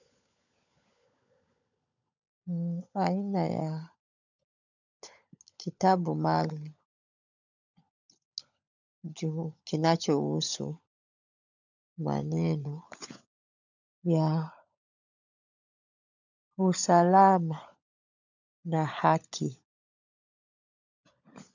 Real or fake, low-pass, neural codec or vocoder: fake; 7.2 kHz; codec, 16 kHz, 16 kbps, FunCodec, trained on LibriTTS, 50 frames a second